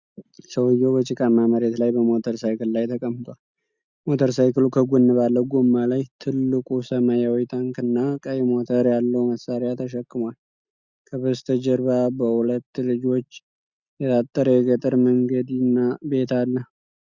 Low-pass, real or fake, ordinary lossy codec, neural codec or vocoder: 7.2 kHz; real; Opus, 64 kbps; none